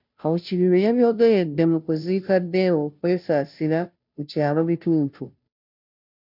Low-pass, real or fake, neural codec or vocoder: 5.4 kHz; fake; codec, 16 kHz, 0.5 kbps, FunCodec, trained on Chinese and English, 25 frames a second